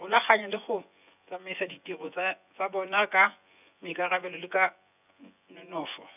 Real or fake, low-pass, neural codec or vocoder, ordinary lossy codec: fake; 3.6 kHz; vocoder, 24 kHz, 100 mel bands, Vocos; none